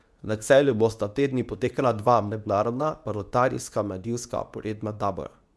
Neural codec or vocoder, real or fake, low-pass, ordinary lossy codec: codec, 24 kHz, 0.9 kbps, WavTokenizer, medium speech release version 2; fake; none; none